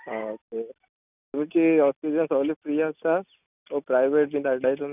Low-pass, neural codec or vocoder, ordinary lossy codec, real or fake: 3.6 kHz; none; none; real